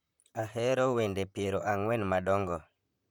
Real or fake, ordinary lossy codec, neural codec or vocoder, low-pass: fake; none; vocoder, 44.1 kHz, 128 mel bands, Pupu-Vocoder; 19.8 kHz